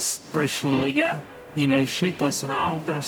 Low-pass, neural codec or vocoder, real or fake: 19.8 kHz; codec, 44.1 kHz, 0.9 kbps, DAC; fake